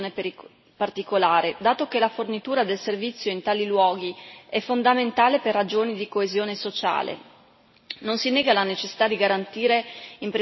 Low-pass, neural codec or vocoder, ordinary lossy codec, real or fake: 7.2 kHz; none; MP3, 24 kbps; real